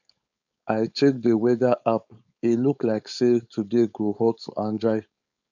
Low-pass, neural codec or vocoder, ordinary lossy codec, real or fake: 7.2 kHz; codec, 16 kHz, 4.8 kbps, FACodec; none; fake